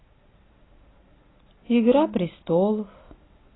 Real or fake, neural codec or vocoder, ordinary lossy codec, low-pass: real; none; AAC, 16 kbps; 7.2 kHz